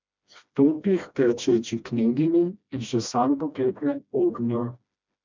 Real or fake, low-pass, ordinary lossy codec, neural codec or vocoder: fake; 7.2 kHz; MP3, 64 kbps; codec, 16 kHz, 1 kbps, FreqCodec, smaller model